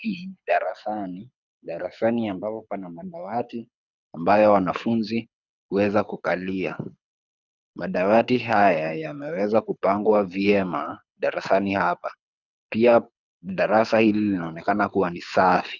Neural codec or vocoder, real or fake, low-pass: codec, 24 kHz, 6 kbps, HILCodec; fake; 7.2 kHz